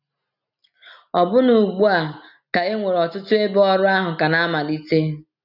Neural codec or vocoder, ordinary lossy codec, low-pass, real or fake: none; none; 5.4 kHz; real